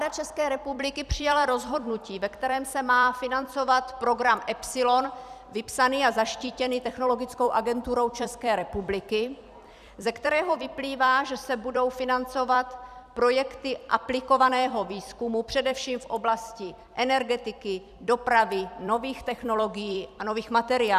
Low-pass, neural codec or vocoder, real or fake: 14.4 kHz; vocoder, 44.1 kHz, 128 mel bands every 256 samples, BigVGAN v2; fake